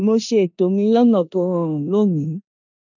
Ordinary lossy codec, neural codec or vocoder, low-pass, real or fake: none; codec, 16 kHz in and 24 kHz out, 0.9 kbps, LongCat-Audio-Codec, four codebook decoder; 7.2 kHz; fake